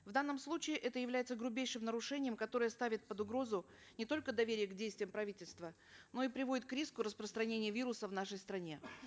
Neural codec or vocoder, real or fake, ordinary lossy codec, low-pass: none; real; none; none